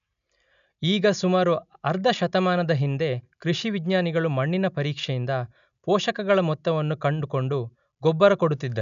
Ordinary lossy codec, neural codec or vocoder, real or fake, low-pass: none; none; real; 7.2 kHz